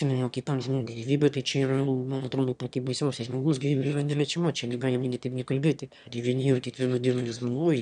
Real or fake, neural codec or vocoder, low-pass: fake; autoencoder, 22.05 kHz, a latent of 192 numbers a frame, VITS, trained on one speaker; 9.9 kHz